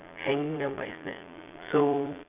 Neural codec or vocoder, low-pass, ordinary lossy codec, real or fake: vocoder, 22.05 kHz, 80 mel bands, Vocos; 3.6 kHz; none; fake